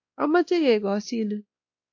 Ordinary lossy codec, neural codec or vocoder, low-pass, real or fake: AAC, 48 kbps; codec, 16 kHz, 1 kbps, X-Codec, WavLM features, trained on Multilingual LibriSpeech; 7.2 kHz; fake